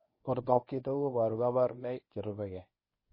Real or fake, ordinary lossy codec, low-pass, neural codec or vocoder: fake; MP3, 24 kbps; 5.4 kHz; codec, 24 kHz, 0.9 kbps, WavTokenizer, medium speech release version 1